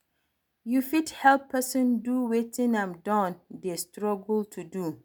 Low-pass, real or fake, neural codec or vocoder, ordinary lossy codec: none; real; none; none